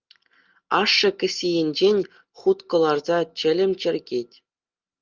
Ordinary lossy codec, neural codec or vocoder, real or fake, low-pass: Opus, 32 kbps; none; real; 7.2 kHz